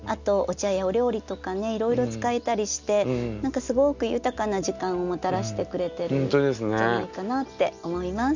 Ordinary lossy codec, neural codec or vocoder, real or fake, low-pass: none; none; real; 7.2 kHz